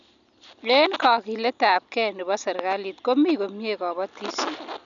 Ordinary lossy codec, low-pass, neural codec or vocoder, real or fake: none; 7.2 kHz; none; real